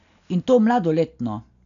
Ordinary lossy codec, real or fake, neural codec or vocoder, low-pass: none; real; none; 7.2 kHz